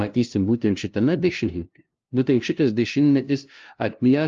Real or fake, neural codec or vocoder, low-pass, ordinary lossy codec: fake; codec, 16 kHz, 0.5 kbps, FunCodec, trained on LibriTTS, 25 frames a second; 7.2 kHz; Opus, 24 kbps